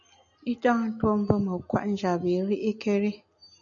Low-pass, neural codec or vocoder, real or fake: 7.2 kHz; none; real